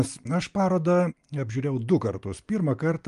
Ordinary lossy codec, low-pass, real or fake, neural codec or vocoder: Opus, 32 kbps; 10.8 kHz; real; none